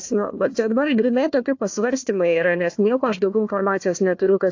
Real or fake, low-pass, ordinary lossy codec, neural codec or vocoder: fake; 7.2 kHz; AAC, 48 kbps; codec, 16 kHz, 1 kbps, FunCodec, trained on Chinese and English, 50 frames a second